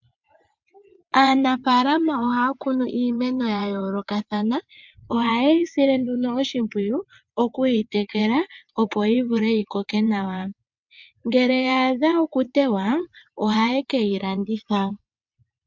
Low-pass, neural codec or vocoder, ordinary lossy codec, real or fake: 7.2 kHz; vocoder, 22.05 kHz, 80 mel bands, WaveNeXt; MP3, 64 kbps; fake